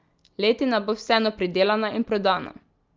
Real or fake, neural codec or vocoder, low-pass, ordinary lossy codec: real; none; 7.2 kHz; Opus, 24 kbps